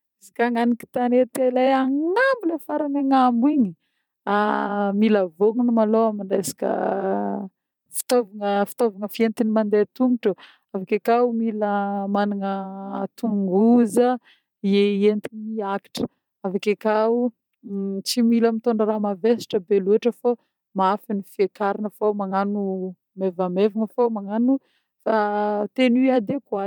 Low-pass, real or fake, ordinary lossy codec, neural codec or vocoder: 19.8 kHz; fake; none; vocoder, 44.1 kHz, 128 mel bands every 512 samples, BigVGAN v2